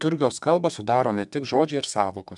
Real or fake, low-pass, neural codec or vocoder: fake; 10.8 kHz; codec, 32 kHz, 1.9 kbps, SNAC